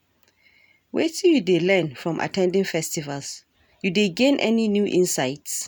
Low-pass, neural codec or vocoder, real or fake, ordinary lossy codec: none; none; real; none